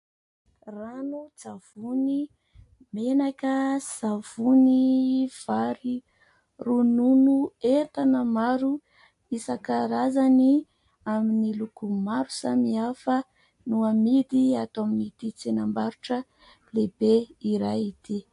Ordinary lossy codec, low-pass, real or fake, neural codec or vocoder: AAC, 64 kbps; 10.8 kHz; real; none